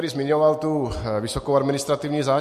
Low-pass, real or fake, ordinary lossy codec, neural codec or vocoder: 14.4 kHz; real; MP3, 64 kbps; none